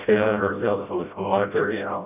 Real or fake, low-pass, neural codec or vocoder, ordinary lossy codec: fake; 3.6 kHz; codec, 16 kHz, 0.5 kbps, FreqCodec, smaller model; none